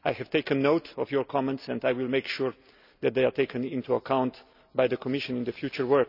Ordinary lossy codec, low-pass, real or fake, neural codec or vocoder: none; 5.4 kHz; real; none